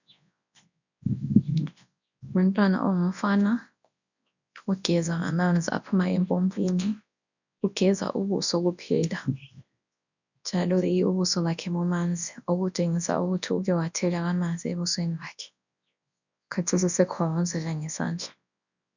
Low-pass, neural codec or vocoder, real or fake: 7.2 kHz; codec, 24 kHz, 0.9 kbps, WavTokenizer, large speech release; fake